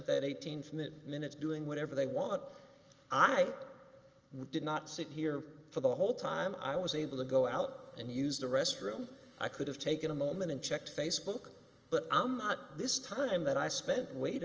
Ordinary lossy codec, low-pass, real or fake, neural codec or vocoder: Opus, 24 kbps; 7.2 kHz; real; none